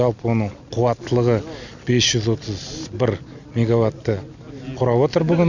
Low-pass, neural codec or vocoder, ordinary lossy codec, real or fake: 7.2 kHz; none; none; real